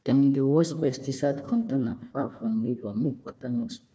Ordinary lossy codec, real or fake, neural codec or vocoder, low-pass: none; fake; codec, 16 kHz, 1 kbps, FunCodec, trained on Chinese and English, 50 frames a second; none